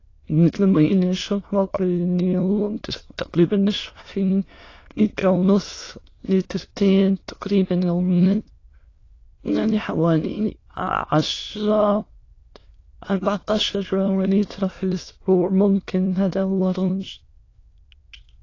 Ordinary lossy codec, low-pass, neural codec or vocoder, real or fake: AAC, 32 kbps; 7.2 kHz; autoencoder, 22.05 kHz, a latent of 192 numbers a frame, VITS, trained on many speakers; fake